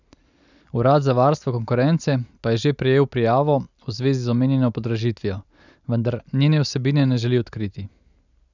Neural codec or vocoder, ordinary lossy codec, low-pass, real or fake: none; none; 7.2 kHz; real